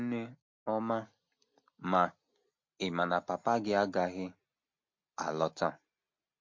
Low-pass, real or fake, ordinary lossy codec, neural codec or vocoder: 7.2 kHz; real; MP3, 48 kbps; none